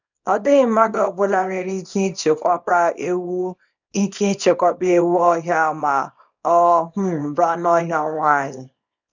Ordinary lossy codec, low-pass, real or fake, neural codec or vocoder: none; 7.2 kHz; fake; codec, 24 kHz, 0.9 kbps, WavTokenizer, small release